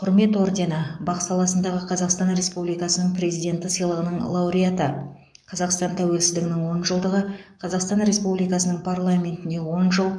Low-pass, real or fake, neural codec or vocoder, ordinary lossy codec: 9.9 kHz; fake; codec, 44.1 kHz, 7.8 kbps, DAC; none